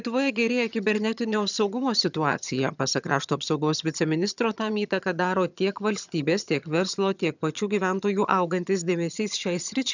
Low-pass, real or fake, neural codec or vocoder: 7.2 kHz; fake; vocoder, 22.05 kHz, 80 mel bands, HiFi-GAN